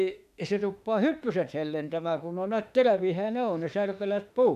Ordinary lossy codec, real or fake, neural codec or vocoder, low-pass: none; fake; autoencoder, 48 kHz, 32 numbers a frame, DAC-VAE, trained on Japanese speech; 14.4 kHz